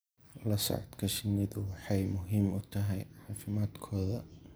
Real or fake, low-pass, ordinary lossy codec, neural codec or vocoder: real; none; none; none